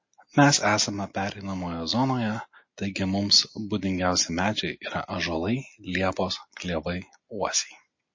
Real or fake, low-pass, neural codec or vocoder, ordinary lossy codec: real; 7.2 kHz; none; MP3, 32 kbps